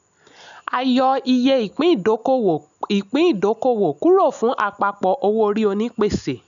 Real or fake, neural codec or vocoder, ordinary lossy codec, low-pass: real; none; none; 7.2 kHz